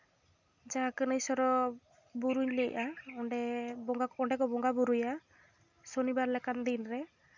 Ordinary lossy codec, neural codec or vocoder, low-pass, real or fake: none; none; 7.2 kHz; real